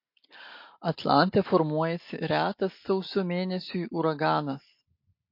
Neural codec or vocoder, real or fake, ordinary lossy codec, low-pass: none; real; MP3, 32 kbps; 5.4 kHz